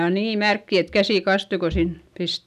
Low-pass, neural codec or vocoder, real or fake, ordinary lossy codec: 14.4 kHz; none; real; none